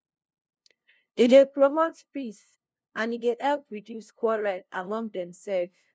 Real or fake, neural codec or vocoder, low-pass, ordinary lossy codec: fake; codec, 16 kHz, 0.5 kbps, FunCodec, trained on LibriTTS, 25 frames a second; none; none